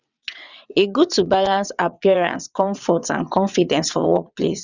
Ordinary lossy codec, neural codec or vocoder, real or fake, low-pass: none; vocoder, 22.05 kHz, 80 mel bands, WaveNeXt; fake; 7.2 kHz